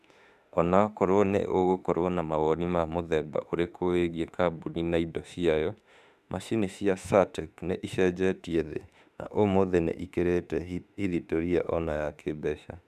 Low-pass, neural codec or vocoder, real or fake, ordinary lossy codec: 14.4 kHz; autoencoder, 48 kHz, 32 numbers a frame, DAC-VAE, trained on Japanese speech; fake; none